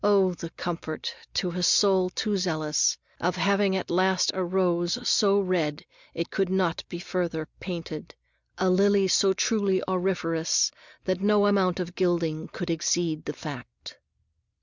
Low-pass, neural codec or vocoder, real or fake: 7.2 kHz; none; real